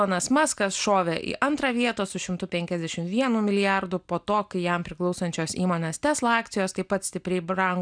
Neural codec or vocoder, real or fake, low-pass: none; real; 9.9 kHz